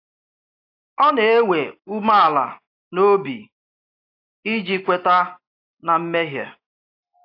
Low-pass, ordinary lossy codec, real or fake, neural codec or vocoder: 5.4 kHz; AAC, 32 kbps; real; none